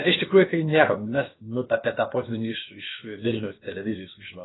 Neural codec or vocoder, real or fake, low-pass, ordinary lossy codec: codec, 16 kHz, about 1 kbps, DyCAST, with the encoder's durations; fake; 7.2 kHz; AAC, 16 kbps